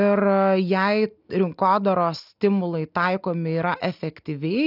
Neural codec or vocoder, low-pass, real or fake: none; 5.4 kHz; real